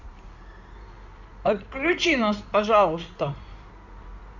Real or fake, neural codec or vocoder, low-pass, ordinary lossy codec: fake; codec, 16 kHz in and 24 kHz out, 2.2 kbps, FireRedTTS-2 codec; 7.2 kHz; none